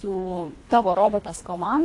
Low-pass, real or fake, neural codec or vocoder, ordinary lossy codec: 10.8 kHz; fake; codec, 24 kHz, 1.5 kbps, HILCodec; AAC, 48 kbps